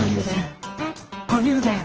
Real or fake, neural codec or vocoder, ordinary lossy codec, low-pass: fake; codec, 24 kHz, 0.9 kbps, WavTokenizer, medium music audio release; Opus, 16 kbps; 7.2 kHz